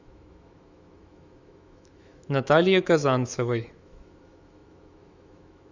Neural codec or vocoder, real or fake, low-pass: autoencoder, 48 kHz, 128 numbers a frame, DAC-VAE, trained on Japanese speech; fake; 7.2 kHz